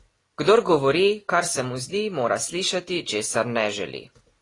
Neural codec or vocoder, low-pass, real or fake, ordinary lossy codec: none; 10.8 kHz; real; AAC, 32 kbps